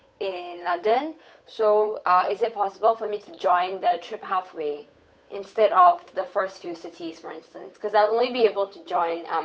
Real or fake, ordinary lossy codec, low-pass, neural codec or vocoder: fake; none; none; codec, 16 kHz, 8 kbps, FunCodec, trained on Chinese and English, 25 frames a second